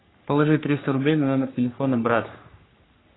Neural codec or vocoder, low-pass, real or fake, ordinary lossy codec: codec, 44.1 kHz, 3.4 kbps, Pupu-Codec; 7.2 kHz; fake; AAC, 16 kbps